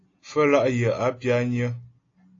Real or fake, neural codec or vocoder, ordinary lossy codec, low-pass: real; none; AAC, 32 kbps; 7.2 kHz